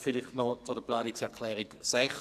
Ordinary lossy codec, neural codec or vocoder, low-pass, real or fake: none; codec, 44.1 kHz, 2.6 kbps, SNAC; 14.4 kHz; fake